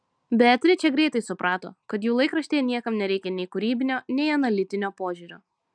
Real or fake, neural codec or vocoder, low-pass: real; none; 9.9 kHz